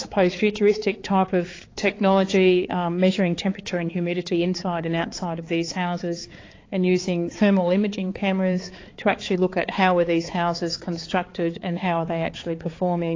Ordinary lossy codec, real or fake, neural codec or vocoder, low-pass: AAC, 32 kbps; fake; codec, 16 kHz, 4 kbps, X-Codec, HuBERT features, trained on balanced general audio; 7.2 kHz